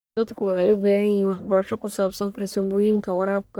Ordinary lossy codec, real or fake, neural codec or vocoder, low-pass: none; fake; codec, 44.1 kHz, 1.7 kbps, Pupu-Codec; none